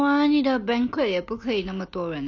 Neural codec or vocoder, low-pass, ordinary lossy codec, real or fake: none; 7.2 kHz; none; real